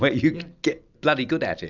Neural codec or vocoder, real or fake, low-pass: none; real; 7.2 kHz